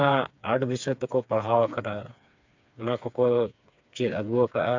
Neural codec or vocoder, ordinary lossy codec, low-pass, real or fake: codec, 16 kHz, 4 kbps, FreqCodec, smaller model; MP3, 64 kbps; 7.2 kHz; fake